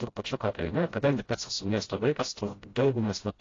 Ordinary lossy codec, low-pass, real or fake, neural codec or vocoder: AAC, 32 kbps; 7.2 kHz; fake; codec, 16 kHz, 0.5 kbps, FreqCodec, smaller model